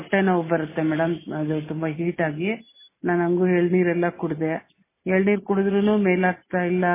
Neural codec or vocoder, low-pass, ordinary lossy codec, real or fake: none; 3.6 kHz; MP3, 16 kbps; real